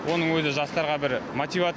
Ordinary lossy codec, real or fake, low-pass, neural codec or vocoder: none; real; none; none